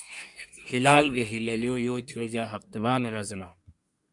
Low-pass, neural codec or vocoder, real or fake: 10.8 kHz; codec, 24 kHz, 1 kbps, SNAC; fake